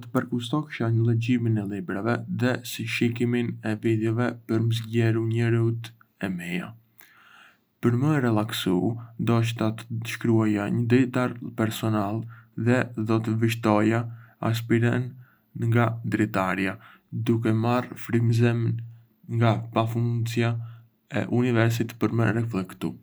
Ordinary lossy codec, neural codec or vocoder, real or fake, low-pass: none; none; real; none